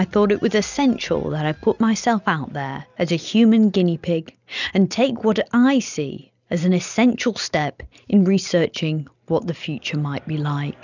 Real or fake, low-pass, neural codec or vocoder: real; 7.2 kHz; none